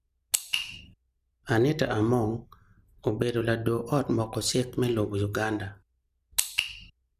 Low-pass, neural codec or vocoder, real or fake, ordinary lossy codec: 14.4 kHz; none; real; none